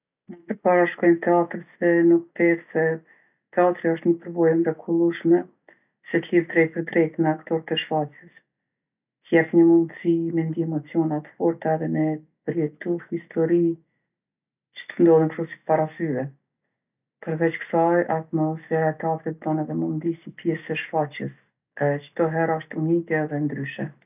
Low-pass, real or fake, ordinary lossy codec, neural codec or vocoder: 3.6 kHz; real; none; none